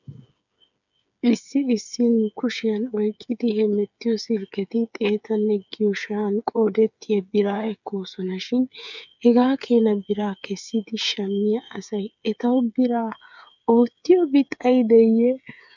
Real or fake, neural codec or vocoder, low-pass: fake; codec, 16 kHz, 8 kbps, FreqCodec, smaller model; 7.2 kHz